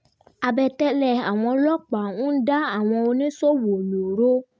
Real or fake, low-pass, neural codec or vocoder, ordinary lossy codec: real; none; none; none